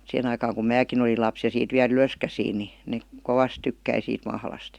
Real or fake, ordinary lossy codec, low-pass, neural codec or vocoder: real; Opus, 64 kbps; 19.8 kHz; none